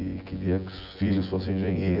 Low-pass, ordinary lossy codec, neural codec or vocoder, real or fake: 5.4 kHz; Opus, 64 kbps; vocoder, 24 kHz, 100 mel bands, Vocos; fake